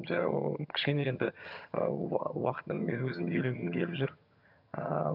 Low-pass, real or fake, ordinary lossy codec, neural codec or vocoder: 5.4 kHz; fake; none; vocoder, 22.05 kHz, 80 mel bands, HiFi-GAN